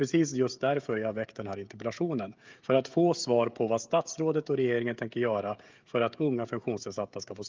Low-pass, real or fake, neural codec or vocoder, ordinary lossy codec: 7.2 kHz; fake; codec, 16 kHz, 16 kbps, FreqCodec, smaller model; Opus, 32 kbps